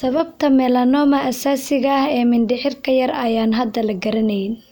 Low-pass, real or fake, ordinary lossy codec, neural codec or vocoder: none; real; none; none